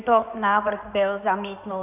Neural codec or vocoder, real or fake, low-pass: codec, 16 kHz in and 24 kHz out, 2.2 kbps, FireRedTTS-2 codec; fake; 3.6 kHz